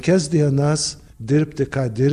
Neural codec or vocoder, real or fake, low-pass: none; real; 14.4 kHz